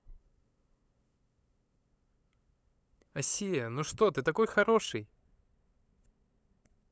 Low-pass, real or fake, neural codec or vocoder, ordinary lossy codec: none; fake; codec, 16 kHz, 8 kbps, FunCodec, trained on LibriTTS, 25 frames a second; none